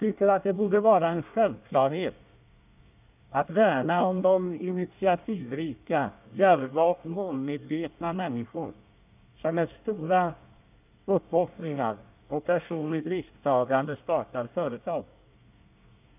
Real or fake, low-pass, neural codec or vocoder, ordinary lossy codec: fake; 3.6 kHz; codec, 24 kHz, 1 kbps, SNAC; none